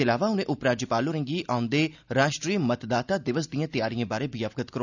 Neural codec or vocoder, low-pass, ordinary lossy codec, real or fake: none; 7.2 kHz; none; real